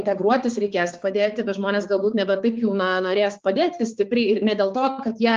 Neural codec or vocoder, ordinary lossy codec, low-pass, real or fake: codec, 16 kHz, 4 kbps, X-Codec, HuBERT features, trained on balanced general audio; Opus, 24 kbps; 7.2 kHz; fake